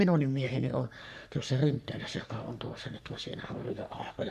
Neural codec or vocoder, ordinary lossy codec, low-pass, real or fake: codec, 44.1 kHz, 3.4 kbps, Pupu-Codec; none; 14.4 kHz; fake